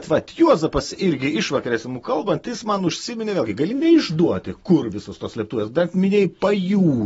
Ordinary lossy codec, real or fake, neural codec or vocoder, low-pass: AAC, 24 kbps; real; none; 19.8 kHz